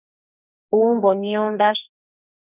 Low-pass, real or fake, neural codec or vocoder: 3.6 kHz; fake; codec, 44.1 kHz, 2.6 kbps, SNAC